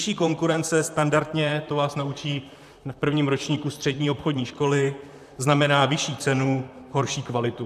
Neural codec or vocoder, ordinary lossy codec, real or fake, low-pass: vocoder, 44.1 kHz, 128 mel bands, Pupu-Vocoder; AAC, 96 kbps; fake; 14.4 kHz